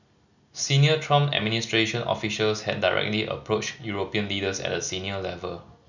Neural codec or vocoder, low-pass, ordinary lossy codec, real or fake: none; 7.2 kHz; none; real